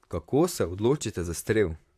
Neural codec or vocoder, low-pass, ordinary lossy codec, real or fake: vocoder, 44.1 kHz, 128 mel bands, Pupu-Vocoder; 14.4 kHz; AAC, 96 kbps; fake